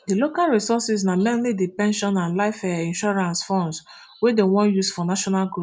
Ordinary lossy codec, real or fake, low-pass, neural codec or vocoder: none; real; none; none